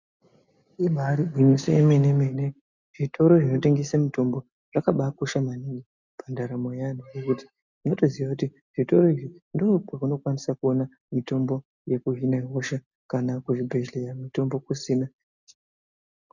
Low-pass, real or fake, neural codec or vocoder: 7.2 kHz; real; none